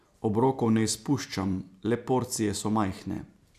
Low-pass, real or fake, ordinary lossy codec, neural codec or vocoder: 14.4 kHz; real; none; none